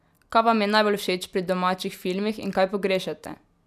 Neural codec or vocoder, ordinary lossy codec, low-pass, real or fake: none; none; 14.4 kHz; real